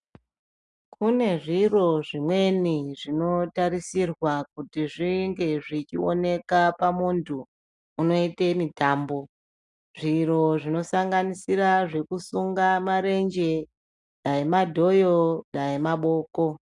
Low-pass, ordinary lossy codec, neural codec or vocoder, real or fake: 10.8 kHz; MP3, 96 kbps; none; real